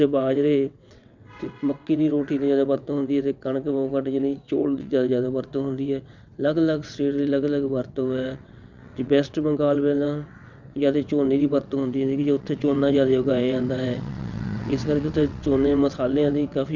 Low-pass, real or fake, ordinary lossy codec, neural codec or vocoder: 7.2 kHz; fake; none; vocoder, 22.05 kHz, 80 mel bands, WaveNeXt